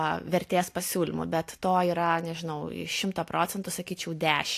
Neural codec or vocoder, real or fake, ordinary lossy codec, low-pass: none; real; AAC, 64 kbps; 14.4 kHz